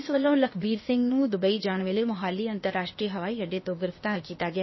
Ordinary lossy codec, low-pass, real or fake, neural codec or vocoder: MP3, 24 kbps; 7.2 kHz; fake; codec, 16 kHz, 0.8 kbps, ZipCodec